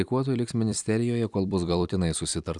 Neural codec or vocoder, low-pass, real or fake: vocoder, 44.1 kHz, 128 mel bands every 256 samples, BigVGAN v2; 10.8 kHz; fake